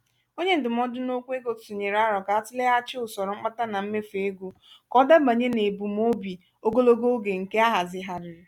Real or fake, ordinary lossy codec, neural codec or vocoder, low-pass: real; none; none; 19.8 kHz